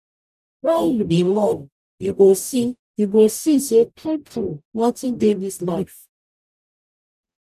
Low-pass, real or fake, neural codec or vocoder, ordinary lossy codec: 14.4 kHz; fake; codec, 44.1 kHz, 0.9 kbps, DAC; none